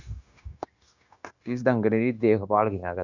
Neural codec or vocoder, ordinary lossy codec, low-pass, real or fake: codec, 16 kHz, 0.9 kbps, LongCat-Audio-Codec; none; 7.2 kHz; fake